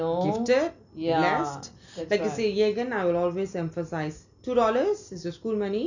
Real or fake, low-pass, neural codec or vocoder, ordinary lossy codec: real; 7.2 kHz; none; none